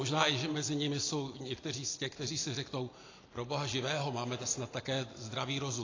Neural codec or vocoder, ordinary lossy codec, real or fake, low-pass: none; AAC, 32 kbps; real; 7.2 kHz